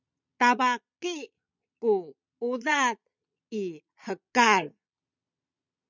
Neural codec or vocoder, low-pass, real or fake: vocoder, 22.05 kHz, 80 mel bands, Vocos; 7.2 kHz; fake